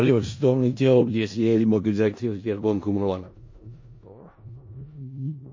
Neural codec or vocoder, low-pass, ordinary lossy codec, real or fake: codec, 16 kHz in and 24 kHz out, 0.4 kbps, LongCat-Audio-Codec, four codebook decoder; 7.2 kHz; MP3, 32 kbps; fake